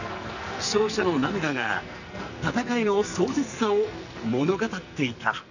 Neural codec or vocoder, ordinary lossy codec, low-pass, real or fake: codec, 44.1 kHz, 2.6 kbps, SNAC; none; 7.2 kHz; fake